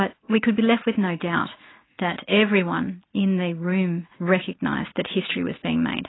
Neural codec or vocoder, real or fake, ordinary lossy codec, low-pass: none; real; AAC, 16 kbps; 7.2 kHz